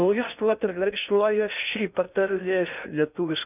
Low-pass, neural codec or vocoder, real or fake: 3.6 kHz; codec, 16 kHz in and 24 kHz out, 0.6 kbps, FocalCodec, streaming, 2048 codes; fake